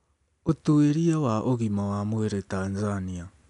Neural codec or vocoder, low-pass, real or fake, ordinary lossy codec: none; 10.8 kHz; real; none